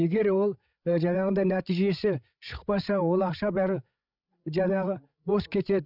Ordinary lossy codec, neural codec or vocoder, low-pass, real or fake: none; codec, 16 kHz, 16 kbps, FreqCodec, larger model; 5.4 kHz; fake